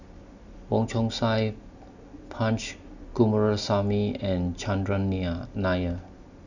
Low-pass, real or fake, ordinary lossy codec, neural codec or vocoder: 7.2 kHz; real; none; none